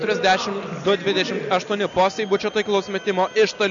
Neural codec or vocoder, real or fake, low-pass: none; real; 7.2 kHz